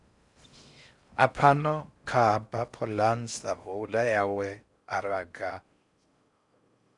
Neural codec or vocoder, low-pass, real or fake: codec, 16 kHz in and 24 kHz out, 0.6 kbps, FocalCodec, streaming, 4096 codes; 10.8 kHz; fake